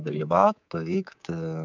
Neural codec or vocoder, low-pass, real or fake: codec, 24 kHz, 3.1 kbps, DualCodec; 7.2 kHz; fake